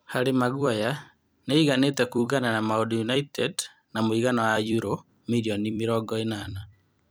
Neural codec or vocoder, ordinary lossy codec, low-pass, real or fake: vocoder, 44.1 kHz, 128 mel bands every 256 samples, BigVGAN v2; none; none; fake